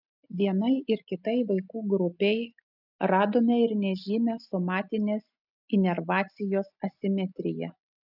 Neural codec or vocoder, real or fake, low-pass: none; real; 5.4 kHz